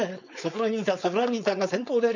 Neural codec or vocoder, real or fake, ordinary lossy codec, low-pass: codec, 16 kHz, 4.8 kbps, FACodec; fake; none; 7.2 kHz